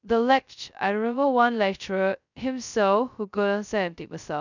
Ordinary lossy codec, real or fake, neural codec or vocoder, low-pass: none; fake; codec, 16 kHz, 0.2 kbps, FocalCodec; 7.2 kHz